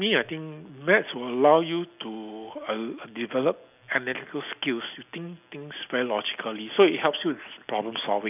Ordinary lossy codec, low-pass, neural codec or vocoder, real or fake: none; 3.6 kHz; none; real